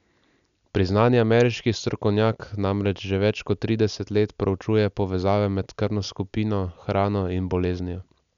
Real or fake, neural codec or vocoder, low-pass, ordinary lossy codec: real; none; 7.2 kHz; none